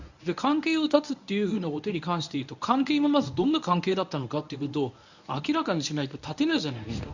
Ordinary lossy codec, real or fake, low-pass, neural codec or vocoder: none; fake; 7.2 kHz; codec, 24 kHz, 0.9 kbps, WavTokenizer, medium speech release version 1